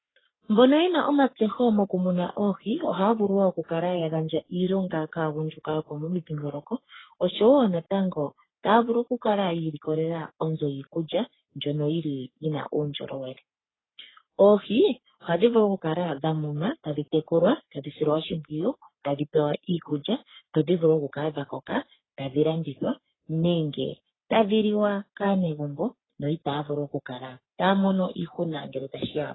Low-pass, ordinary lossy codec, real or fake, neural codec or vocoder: 7.2 kHz; AAC, 16 kbps; fake; codec, 44.1 kHz, 3.4 kbps, Pupu-Codec